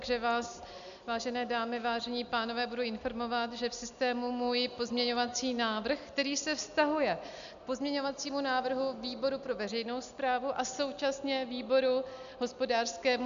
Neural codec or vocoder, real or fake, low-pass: none; real; 7.2 kHz